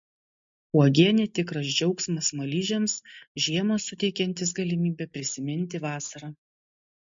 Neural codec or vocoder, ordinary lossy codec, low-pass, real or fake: none; MP3, 48 kbps; 7.2 kHz; real